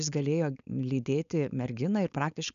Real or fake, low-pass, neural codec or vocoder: fake; 7.2 kHz; codec, 16 kHz, 4.8 kbps, FACodec